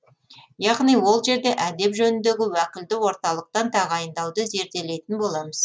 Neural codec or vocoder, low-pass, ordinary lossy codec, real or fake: none; none; none; real